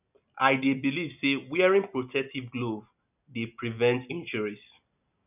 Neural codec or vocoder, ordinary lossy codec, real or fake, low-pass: none; none; real; 3.6 kHz